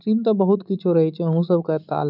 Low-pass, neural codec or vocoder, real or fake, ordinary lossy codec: 5.4 kHz; none; real; none